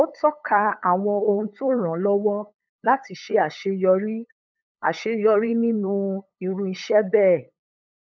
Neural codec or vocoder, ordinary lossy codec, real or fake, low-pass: codec, 16 kHz, 8 kbps, FunCodec, trained on LibriTTS, 25 frames a second; none; fake; 7.2 kHz